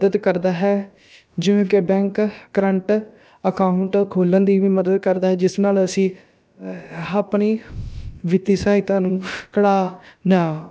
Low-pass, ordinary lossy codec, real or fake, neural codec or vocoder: none; none; fake; codec, 16 kHz, about 1 kbps, DyCAST, with the encoder's durations